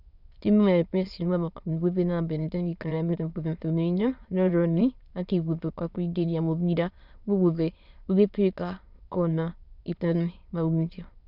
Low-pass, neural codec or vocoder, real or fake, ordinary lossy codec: 5.4 kHz; autoencoder, 22.05 kHz, a latent of 192 numbers a frame, VITS, trained on many speakers; fake; Opus, 64 kbps